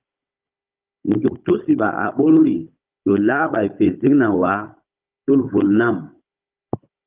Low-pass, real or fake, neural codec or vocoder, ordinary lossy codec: 3.6 kHz; fake; codec, 16 kHz, 16 kbps, FunCodec, trained on Chinese and English, 50 frames a second; Opus, 32 kbps